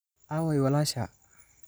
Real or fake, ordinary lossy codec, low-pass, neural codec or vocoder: real; none; none; none